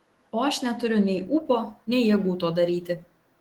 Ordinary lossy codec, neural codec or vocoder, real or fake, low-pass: Opus, 16 kbps; autoencoder, 48 kHz, 128 numbers a frame, DAC-VAE, trained on Japanese speech; fake; 19.8 kHz